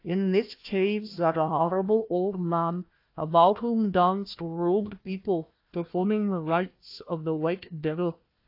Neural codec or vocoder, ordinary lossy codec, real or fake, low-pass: codec, 16 kHz, 1 kbps, FunCodec, trained on Chinese and English, 50 frames a second; AAC, 32 kbps; fake; 5.4 kHz